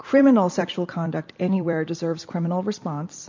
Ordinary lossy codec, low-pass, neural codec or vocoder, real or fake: MP3, 48 kbps; 7.2 kHz; vocoder, 44.1 kHz, 128 mel bands every 256 samples, BigVGAN v2; fake